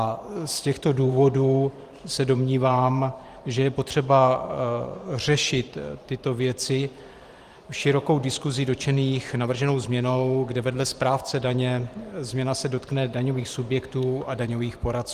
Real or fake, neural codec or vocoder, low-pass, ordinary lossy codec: fake; vocoder, 44.1 kHz, 128 mel bands every 512 samples, BigVGAN v2; 14.4 kHz; Opus, 24 kbps